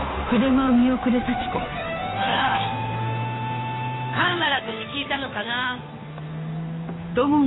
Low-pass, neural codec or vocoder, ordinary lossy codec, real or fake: 7.2 kHz; codec, 16 kHz, 2 kbps, FunCodec, trained on Chinese and English, 25 frames a second; AAC, 16 kbps; fake